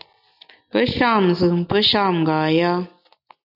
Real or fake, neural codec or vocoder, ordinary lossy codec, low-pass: real; none; AAC, 48 kbps; 5.4 kHz